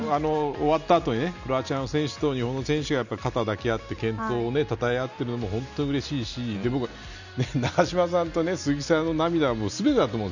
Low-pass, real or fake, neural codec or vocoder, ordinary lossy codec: 7.2 kHz; real; none; none